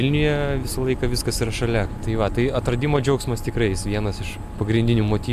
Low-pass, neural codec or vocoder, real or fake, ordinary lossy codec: 14.4 kHz; none; real; AAC, 96 kbps